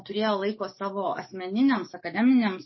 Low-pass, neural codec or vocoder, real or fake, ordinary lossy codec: 7.2 kHz; codec, 44.1 kHz, 7.8 kbps, DAC; fake; MP3, 24 kbps